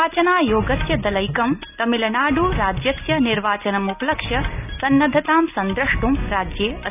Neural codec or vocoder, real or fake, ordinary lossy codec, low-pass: none; real; none; 3.6 kHz